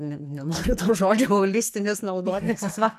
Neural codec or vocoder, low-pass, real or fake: codec, 44.1 kHz, 2.6 kbps, SNAC; 14.4 kHz; fake